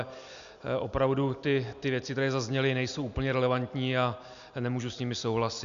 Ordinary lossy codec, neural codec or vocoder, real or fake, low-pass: MP3, 96 kbps; none; real; 7.2 kHz